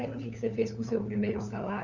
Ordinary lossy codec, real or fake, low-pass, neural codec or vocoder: none; fake; 7.2 kHz; codec, 16 kHz, 4 kbps, FunCodec, trained on LibriTTS, 50 frames a second